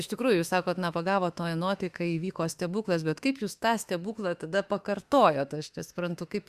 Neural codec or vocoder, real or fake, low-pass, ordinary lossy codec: autoencoder, 48 kHz, 32 numbers a frame, DAC-VAE, trained on Japanese speech; fake; 14.4 kHz; Opus, 64 kbps